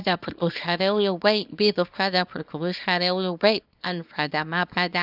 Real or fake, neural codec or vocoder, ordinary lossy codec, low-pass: fake; codec, 24 kHz, 0.9 kbps, WavTokenizer, small release; none; 5.4 kHz